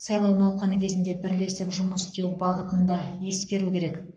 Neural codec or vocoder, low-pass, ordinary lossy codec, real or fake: codec, 44.1 kHz, 3.4 kbps, Pupu-Codec; 9.9 kHz; none; fake